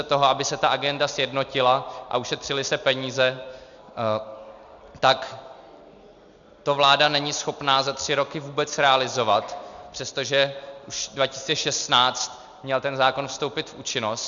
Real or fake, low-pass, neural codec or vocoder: real; 7.2 kHz; none